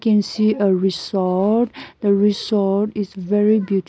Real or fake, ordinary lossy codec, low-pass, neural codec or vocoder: real; none; none; none